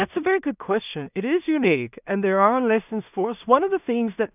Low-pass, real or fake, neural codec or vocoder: 3.6 kHz; fake; codec, 16 kHz in and 24 kHz out, 0.4 kbps, LongCat-Audio-Codec, two codebook decoder